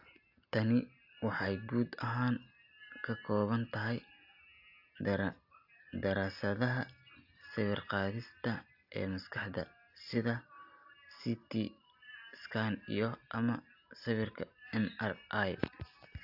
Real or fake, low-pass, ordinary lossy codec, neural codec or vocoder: real; 5.4 kHz; AAC, 48 kbps; none